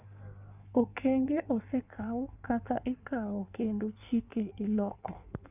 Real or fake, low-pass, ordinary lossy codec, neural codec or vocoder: fake; 3.6 kHz; none; codec, 16 kHz in and 24 kHz out, 1.1 kbps, FireRedTTS-2 codec